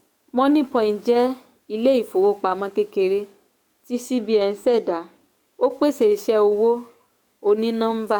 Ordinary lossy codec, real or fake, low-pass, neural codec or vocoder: MP3, 96 kbps; fake; 19.8 kHz; codec, 44.1 kHz, 7.8 kbps, DAC